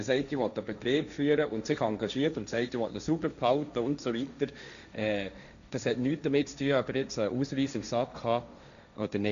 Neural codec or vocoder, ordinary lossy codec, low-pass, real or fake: codec, 16 kHz, 1.1 kbps, Voila-Tokenizer; none; 7.2 kHz; fake